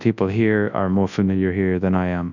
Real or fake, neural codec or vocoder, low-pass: fake; codec, 24 kHz, 0.9 kbps, WavTokenizer, large speech release; 7.2 kHz